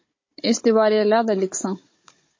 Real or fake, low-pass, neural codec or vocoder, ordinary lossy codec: fake; 7.2 kHz; codec, 16 kHz, 16 kbps, FunCodec, trained on Chinese and English, 50 frames a second; MP3, 32 kbps